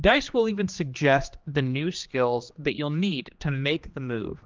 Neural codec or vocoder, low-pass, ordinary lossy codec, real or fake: codec, 16 kHz, 2 kbps, X-Codec, HuBERT features, trained on general audio; 7.2 kHz; Opus, 24 kbps; fake